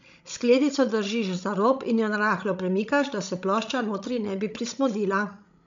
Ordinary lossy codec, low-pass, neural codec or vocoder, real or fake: MP3, 64 kbps; 7.2 kHz; codec, 16 kHz, 16 kbps, FreqCodec, larger model; fake